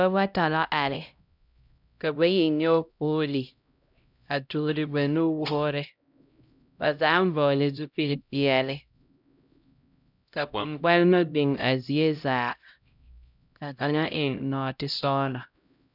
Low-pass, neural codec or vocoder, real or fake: 5.4 kHz; codec, 16 kHz, 0.5 kbps, X-Codec, HuBERT features, trained on LibriSpeech; fake